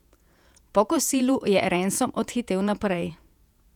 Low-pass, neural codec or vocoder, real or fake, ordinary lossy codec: 19.8 kHz; vocoder, 48 kHz, 128 mel bands, Vocos; fake; none